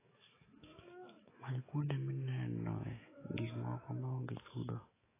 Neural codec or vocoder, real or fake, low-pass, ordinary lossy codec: autoencoder, 48 kHz, 128 numbers a frame, DAC-VAE, trained on Japanese speech; fake; 3.6 kHz; AAC, 16 kbps